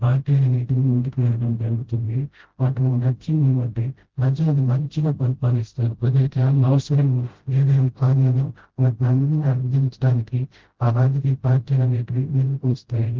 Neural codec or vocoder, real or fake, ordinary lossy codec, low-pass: codec, 16 kHz, 0.5 kbps, FreqCodec, smaller model; fake; Opus, 16 kbps; 7.2 kHz